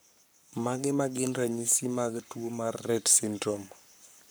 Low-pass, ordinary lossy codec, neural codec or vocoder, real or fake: none; none; codec, 44.1 kHz, 7.8 kbps, Pupu-Codec; fake